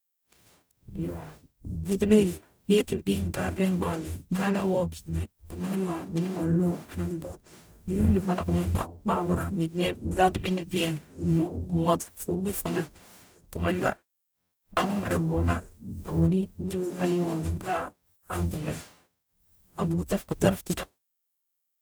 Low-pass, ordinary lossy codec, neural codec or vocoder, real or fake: none; none; codec, 44.1 kHz, 0.9 kbps, DAC; fake